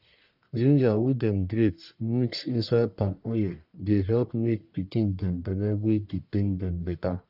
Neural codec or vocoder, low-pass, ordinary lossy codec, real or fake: codec, 44.1 kHz, 1.7 kbps, Pupu-Codec; 5.4 kHz; none; fake